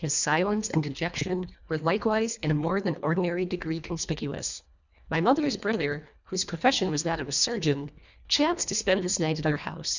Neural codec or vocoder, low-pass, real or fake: codec, 24 kHz, 1.5 kbps, HILCodec; 7.2 kHz; fake